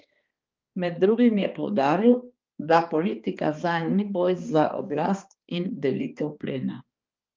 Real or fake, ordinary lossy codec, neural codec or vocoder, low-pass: fake; Opus, 24 kbps; codec, 16 kHz, 2 kbps, X-Codec, HuBERT features, trained on balanced general audio; 7.2 kHz